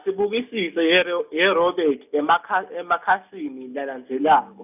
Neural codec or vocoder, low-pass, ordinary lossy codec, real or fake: none; 3.6 kHz; none; real